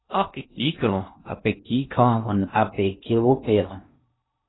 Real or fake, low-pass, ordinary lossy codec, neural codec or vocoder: fake; 7.2 kHz; AAC, 16 kbps; codec, 16 kHz in and 24 kHz out, 0.6 kbps, FocalCodec, streaming, 2048 codes